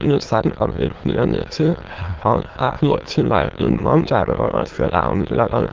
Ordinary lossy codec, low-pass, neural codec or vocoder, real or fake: Opus, 16 kbps; 7.2 kHz; autoencoder, 22.05 kHz, a latent of 192 numbers a frame, VITS, trained on many speakers; fake